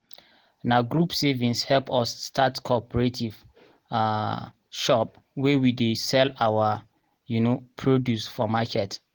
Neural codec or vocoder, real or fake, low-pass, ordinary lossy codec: none; real; 19.8 kHz; Opus, 16 kbps